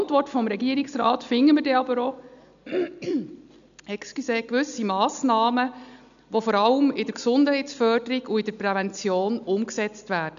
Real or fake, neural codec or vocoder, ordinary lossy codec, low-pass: real; none; none; 7.2 kHz